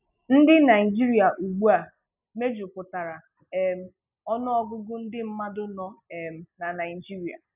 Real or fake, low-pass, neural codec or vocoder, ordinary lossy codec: real; 3.6 kHz; none; none